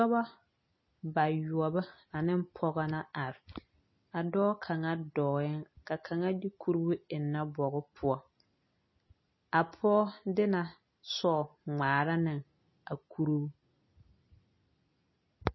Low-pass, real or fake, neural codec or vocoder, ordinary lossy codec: 7.2 kHz; real; none; MP3, 24 kbps